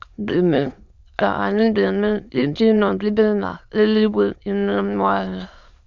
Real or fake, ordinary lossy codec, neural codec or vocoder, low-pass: fake; none; autoencoder, 22.05 kHz, a latent of 192 numbers a frame, VITS, trained on many speakers; 7.2 kHz